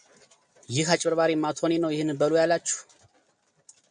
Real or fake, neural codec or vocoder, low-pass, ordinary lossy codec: real; none; 9.9 kHz; MP3, 96 kbps